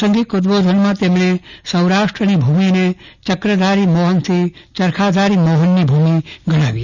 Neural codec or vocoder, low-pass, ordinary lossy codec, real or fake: none; 7.2 kHz; none; real